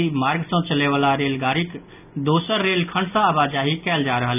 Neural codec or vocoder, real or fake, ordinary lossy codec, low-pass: none; real; none; 3.6 kHz